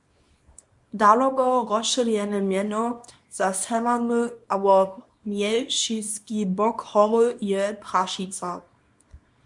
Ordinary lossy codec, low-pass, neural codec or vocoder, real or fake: MP3, 64 kbps; 10.8 kHz; codec, 24 kHz, 0.9 kbps, WavTokenizer, small release; fake